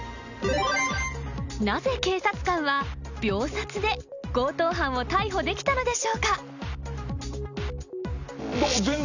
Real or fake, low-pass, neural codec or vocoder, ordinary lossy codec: real; 7.2 kHz; none; none